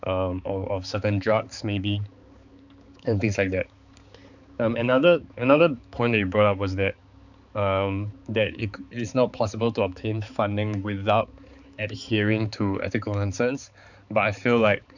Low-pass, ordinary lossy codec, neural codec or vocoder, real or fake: 7.2 kHz; none; codec, 16 kHz, 4 kbps, X-Codec, HuBERT features, trained on balanced general audio; fake